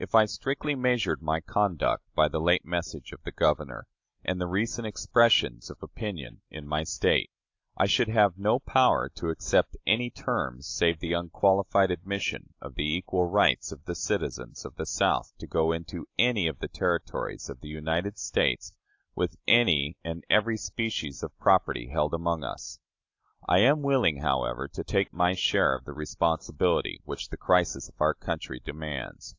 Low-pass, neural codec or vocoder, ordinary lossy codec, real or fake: 7.2 kHz; none; AAC, 48 kbps; real